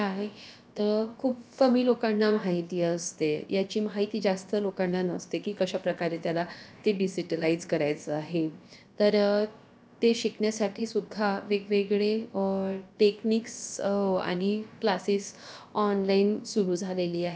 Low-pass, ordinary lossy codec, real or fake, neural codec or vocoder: none; none; fake; codec, 16 kHz, about 1 kbps, DyCAST, with the encoder's durations